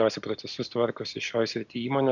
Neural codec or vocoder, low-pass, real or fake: none; 7.2 kHz; real